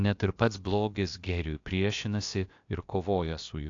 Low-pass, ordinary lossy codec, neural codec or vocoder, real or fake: 7.2 kHz; AAC, 48 kbps; codec, 16 kHz, about 1 kbps, DyCAST, with the encoder's durations; fake